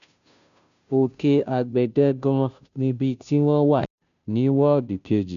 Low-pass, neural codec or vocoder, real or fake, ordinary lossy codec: 7.2 kHz; codec, 16 kHz, 0.5 kbps, FunCodec, trained on Chinese and English, 25 frames a second; fake; none